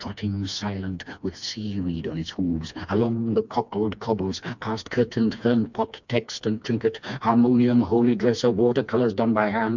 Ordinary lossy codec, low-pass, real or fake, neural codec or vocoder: MP3, 64 kbps; 7.2 kHz; fake; codec, 16 kHz, 2 kbps, FreqCodec, smaller model